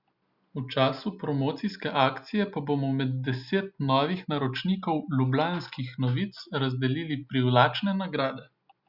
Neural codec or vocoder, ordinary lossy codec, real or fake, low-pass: none; Opus, 64 kbps; real; 5.4 kHz